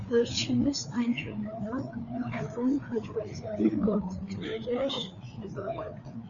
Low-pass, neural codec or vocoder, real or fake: 7.2 kHz; codec, 16 kHz, 4 kbps, FreqCodec, larger model; fake